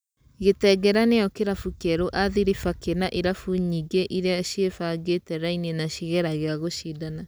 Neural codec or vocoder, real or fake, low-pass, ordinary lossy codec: none; real; none; none